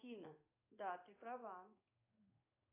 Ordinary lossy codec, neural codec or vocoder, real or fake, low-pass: AAC, 24 kbps; codec, 16 kHz in and 24 kHz out, 1 kbps, XY-Tokenizer; fake; 3.6 kHz